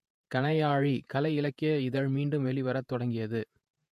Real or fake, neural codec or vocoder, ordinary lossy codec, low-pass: fake; vocoder, 48 kHz, 128 mel bands, Vocos; MP3, 64 kbps; 14.4 kHz